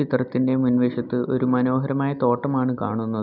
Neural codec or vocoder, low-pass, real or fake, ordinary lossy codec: none; 5.4 kHz; real; none